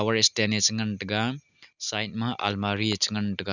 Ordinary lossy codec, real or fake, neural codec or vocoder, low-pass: none; real; none; 7.2 kHz